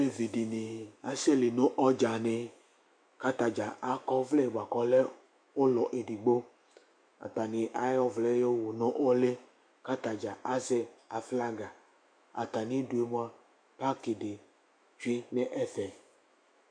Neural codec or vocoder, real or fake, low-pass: autoencoder, 48 kHz, 128 numbers a frame, DAC-VAE, trained on Japanese speech; fake; 9.9 kHz